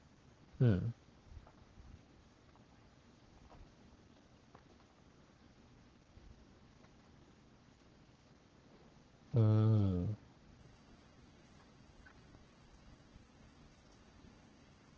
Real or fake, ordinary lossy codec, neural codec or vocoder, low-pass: fake; Opus, 16 kbps; codec, 44.1 kHz, 7.8 kbps, Pupu-Codec; 7.2 kHz